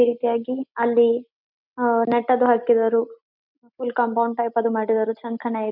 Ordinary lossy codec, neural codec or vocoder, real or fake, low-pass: MP3, 48 kbps; none; real; 5.4 kHz